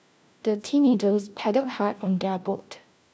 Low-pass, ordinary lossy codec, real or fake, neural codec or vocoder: none; none; fake; codec, 16 kHz, 1 kbps, FunCodec, trained on LibriTTS, 50 frames a second